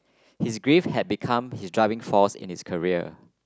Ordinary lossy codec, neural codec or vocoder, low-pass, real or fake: none; none; none; real